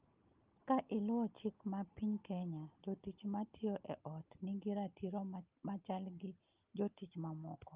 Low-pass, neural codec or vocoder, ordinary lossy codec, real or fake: 3.6 kHz; none; Opus, 24 kbps; real